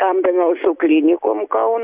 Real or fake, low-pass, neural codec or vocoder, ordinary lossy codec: real; 3.6 kHz; none; Opus, 64 kbps